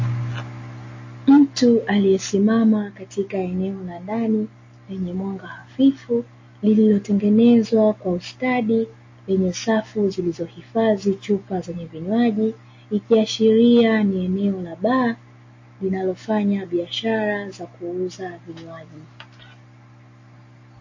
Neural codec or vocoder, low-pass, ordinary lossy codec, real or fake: none; 7.2 kHz; MP3, 32 kbps; real